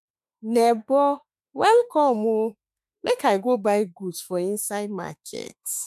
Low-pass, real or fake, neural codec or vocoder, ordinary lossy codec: 14.4 kHz; fake; autoencoder, 48 kHz, 32 numbers a frame, DAC-VAE, trained on Japanese speech; MP3, 96 kbps